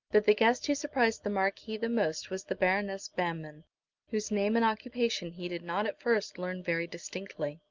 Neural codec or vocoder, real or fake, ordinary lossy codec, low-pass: none; real; Opus, 32 kbps; 7.2 kHz